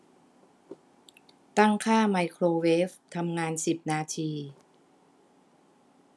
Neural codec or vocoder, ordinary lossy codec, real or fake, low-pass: none; none; real; none